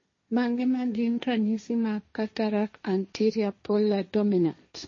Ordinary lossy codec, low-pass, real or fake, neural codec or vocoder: MP3, 32 kbps; 7.2 kHz; fake; codec, 16 kHz, 1.1 kbps, Voila-Tokenizer